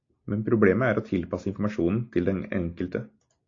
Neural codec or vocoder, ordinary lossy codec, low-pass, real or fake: none; AAC, 48 kbps; 7.2 kHz; real